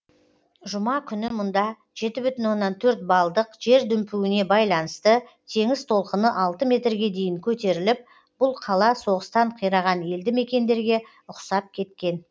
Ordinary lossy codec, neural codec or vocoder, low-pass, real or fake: none; none; none; real